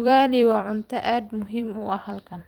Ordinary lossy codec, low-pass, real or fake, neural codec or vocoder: Opus, 24 kbps; 19.8 kHz; fake; vocoder, 44.1 kHz, 128 mel bands every 256 samples, BigVGAN v2